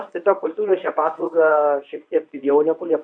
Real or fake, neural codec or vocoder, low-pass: fake; codec, 24 kHz, 0.9 kbps, WavTokenizer, medium speech release version 1; 9.9 kHz